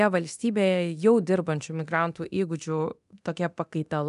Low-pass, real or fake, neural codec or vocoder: 10.8 kHz; fake; codec, 24 kHz, 0.9 kbps, DualCodec